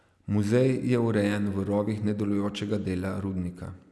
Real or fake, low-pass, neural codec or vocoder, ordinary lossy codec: real; none; none; none